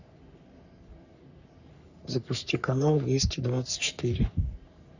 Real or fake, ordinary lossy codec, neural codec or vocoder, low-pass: fake; none; codec, 44.1 kHz, 3.4 kbps, Pupu-Codec; 7.2 kHz